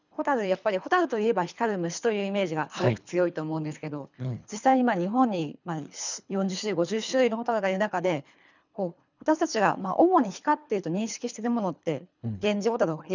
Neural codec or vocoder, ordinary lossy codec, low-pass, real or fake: codec, 24 kHz, 3 kbps, HILCodec; none; 7.2 kHz; fake